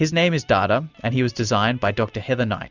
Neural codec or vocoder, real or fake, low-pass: none; real; 7.2 kHz